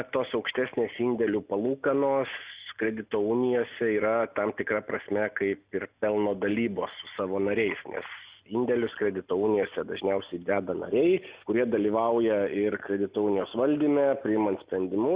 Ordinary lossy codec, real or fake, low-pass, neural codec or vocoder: Opus, 64 kbps; real; 3.6 kHz; none